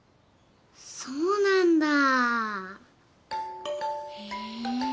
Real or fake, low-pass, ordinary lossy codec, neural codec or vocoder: real; none; none; none